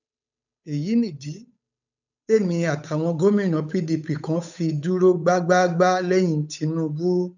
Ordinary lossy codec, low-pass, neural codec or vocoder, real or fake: none; 7.2 kHz; codec, 16 kHz, 8 kbps, FunCodec, trained on Chinese and English, 25 frames a second; fake